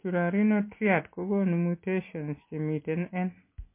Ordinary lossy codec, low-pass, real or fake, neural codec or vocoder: MP3, 24 kbps; 3.6 kHz; real; none